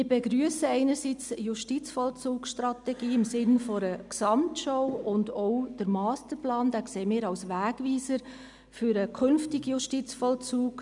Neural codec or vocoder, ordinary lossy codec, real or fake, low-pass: none; MP3, 96 kbps; real; 10.8 kHz